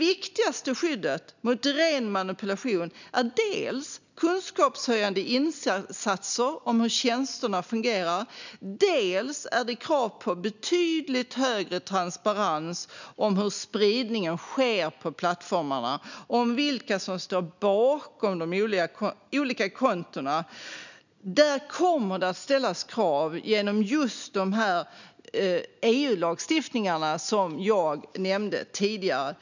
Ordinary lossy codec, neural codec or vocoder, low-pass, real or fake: none; none; 7.2 kHz; real